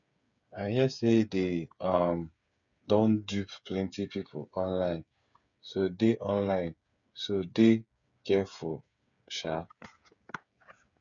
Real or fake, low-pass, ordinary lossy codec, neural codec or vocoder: fake; 7.2 kHz; none; codec, 16 kHz, 8 kbps, FreqCodec, smaller model